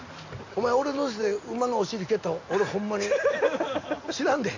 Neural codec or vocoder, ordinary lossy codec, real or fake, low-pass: none; AAC, 48 kbps; real; 7.2 kHz